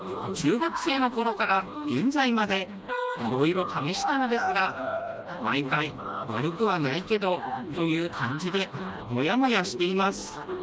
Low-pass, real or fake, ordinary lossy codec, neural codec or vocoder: none; fake; none; codec, 16 kHz, 1 kbps, FreqCodec, smaller model